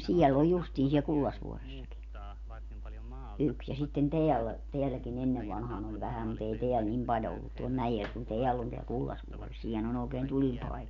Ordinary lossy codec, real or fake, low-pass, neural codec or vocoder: none; real; 7.2 kHz; none